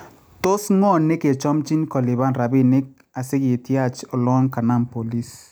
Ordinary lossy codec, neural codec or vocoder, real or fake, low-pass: none; none; real; none